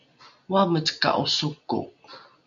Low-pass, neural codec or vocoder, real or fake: 7.2 kHz; none; real